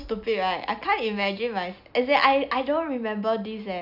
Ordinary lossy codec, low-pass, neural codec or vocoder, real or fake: none; 5.4 kHz; none; real